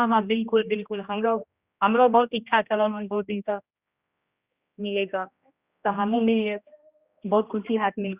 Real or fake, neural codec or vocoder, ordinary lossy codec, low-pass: fake; codec, 16 kHz, 1 kbps, X-Codec, HuBERT features, trained on general audio; Opus, 64 kbps; 3.6 kHz